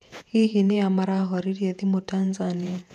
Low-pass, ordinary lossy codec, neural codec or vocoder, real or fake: 14.4 kHz; none; vocoder, 48 kHz, 128 mel bands, Vocos; fake